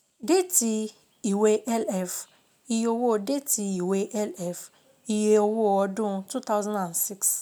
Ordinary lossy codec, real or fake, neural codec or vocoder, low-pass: none; real; none; none